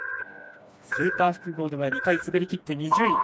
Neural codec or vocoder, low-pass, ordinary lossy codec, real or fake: codec, 16 kHz, 2 kbps, FreqCodec, smaller model; none; none; fake